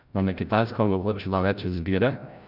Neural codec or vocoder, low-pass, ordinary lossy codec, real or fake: codec, 16 kHz, 0.5 kbps, FreqCodec, larger model; 5.4 kHz; none; fake